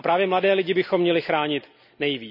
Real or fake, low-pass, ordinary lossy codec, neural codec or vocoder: real; 5.4 kHz; none; none